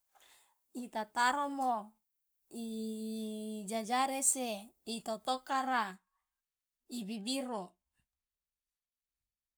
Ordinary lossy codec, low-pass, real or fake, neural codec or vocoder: none; none; fake; codec, 44.1 kHz, 7.8 kbps, Pupu-Codec